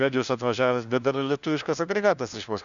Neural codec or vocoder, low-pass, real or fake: codec, 16 kHz, 1 kbps, FunCodec, trained on LibriTTS, 50 frames a second; 7.2 kHz; fake